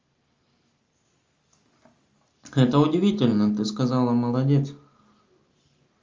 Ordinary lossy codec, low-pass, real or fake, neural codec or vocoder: Opus, 32 kbps; 7.2 kHz; real; none